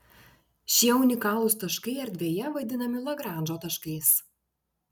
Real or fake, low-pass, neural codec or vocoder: real; 19.8 kHz; none